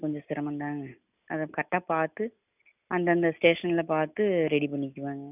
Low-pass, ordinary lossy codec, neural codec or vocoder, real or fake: 3.6 kHz; none; none; real